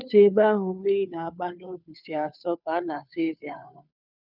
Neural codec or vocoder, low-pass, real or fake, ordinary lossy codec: codec, 16 kHz, 2 kbps, FunCodec, trained on Chinese and English, 25 frames a second; 5.4 kHz; fake; none